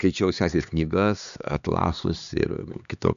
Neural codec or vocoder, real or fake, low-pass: codec, 16 kHz, 2 kbps, X-Codec, HuBERT features, trained on LibriSpeech; fake; 7.2 kHz